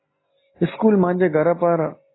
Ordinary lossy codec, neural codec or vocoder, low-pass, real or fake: AAC, 16 kbps; none; 7.2 kHz; real